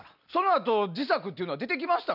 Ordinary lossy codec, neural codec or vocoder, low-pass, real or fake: none; none; 5.4 kHz; real